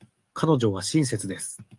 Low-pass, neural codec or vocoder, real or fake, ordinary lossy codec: 10.8 kHz; codec, 44.1 kHz, 7.8 kbps, DAC; fake; Opus, 32 kbps